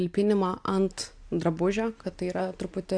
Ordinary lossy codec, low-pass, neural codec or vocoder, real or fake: AAC, 64 kbps; 9.9 kHz; none; real